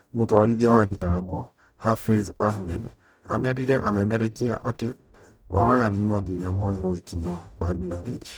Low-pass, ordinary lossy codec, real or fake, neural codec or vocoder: none; none; fake; codec, 44.1 kHz, 0.9 kbps, DAC